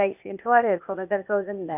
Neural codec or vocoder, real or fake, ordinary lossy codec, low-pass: codec, 16 kHz, 0.8 kbps, ZipCodec; fake; none; 3.6 kHz